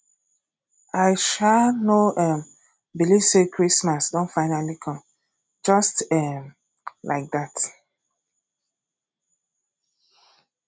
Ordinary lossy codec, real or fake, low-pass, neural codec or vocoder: none; real; none; none